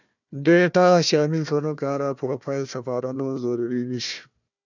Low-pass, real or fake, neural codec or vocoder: 7.2 kHz; fake; codec, 16 kHz, 1 kbps, FunCodec, trained on Chinese and English, 50 frames a second